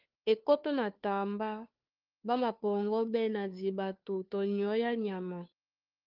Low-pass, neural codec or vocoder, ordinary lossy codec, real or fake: 5.4 kHz; codec, 16 kHz, 2 kbps, FunCodec, trained on Chinese and English, 25 frames a second; Opus, 24 kbps; fake